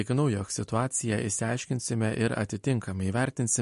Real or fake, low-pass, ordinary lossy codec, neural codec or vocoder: real; 14.4 kHz; MP3, 48 kbps; none